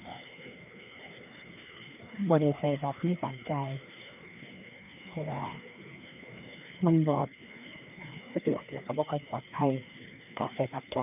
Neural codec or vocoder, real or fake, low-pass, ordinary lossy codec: codec, 16 kHz, 2 kbps, FreqCodec, larger model; fake; 3.6 kHz; none